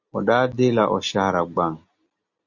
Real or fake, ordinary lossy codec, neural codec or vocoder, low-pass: real; Opus, 64 kbps; none; 7.2 kHz